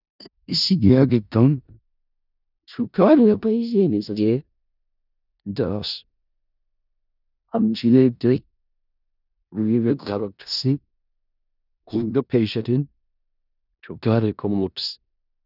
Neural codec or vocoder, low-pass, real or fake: codec, 16 kHz in and 24 kHz out, 0.4 kbps, LongCat-Audio-Codec, four codebook decoder; 5.4 kHz; fake